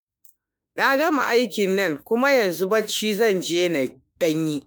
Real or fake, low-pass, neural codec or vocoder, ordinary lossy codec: fake; none; autoencoder, 48 kHz, 32 numbers a frame, DAC-VAE, trained on Japanese speech; none